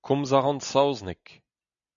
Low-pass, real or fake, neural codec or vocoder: 7.2 kHz; real; none